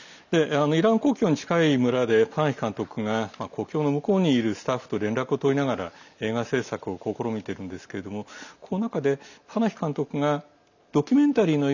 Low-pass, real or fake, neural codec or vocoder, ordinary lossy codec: 7.2 kHz; real; none; none